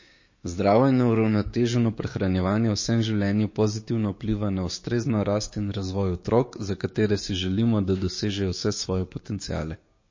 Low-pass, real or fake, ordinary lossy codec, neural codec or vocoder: 7.2 kHz; fake; MP3, 32 kbps; codec, 16 kHz, 6 kbps, DAC